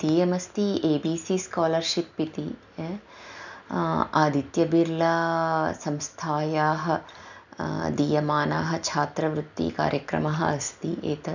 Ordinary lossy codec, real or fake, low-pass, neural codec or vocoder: none; real; 7.2 kHz; none